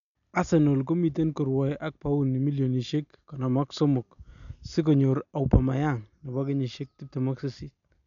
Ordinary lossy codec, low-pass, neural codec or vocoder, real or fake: none; 7.2 kHz; none; real